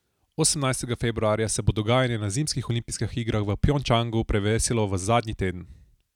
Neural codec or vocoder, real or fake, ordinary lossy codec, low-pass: none; real; none; 19.8 kHz